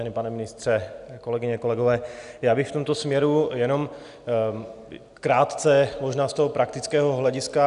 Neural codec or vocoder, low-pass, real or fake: none; 10.8 kHz; real